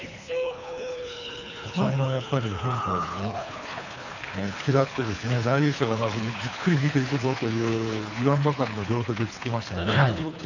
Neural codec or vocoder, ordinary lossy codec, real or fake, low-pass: codec, 24 kHz, 3 kbps, HILCodec; none; fake; 7.2 kHz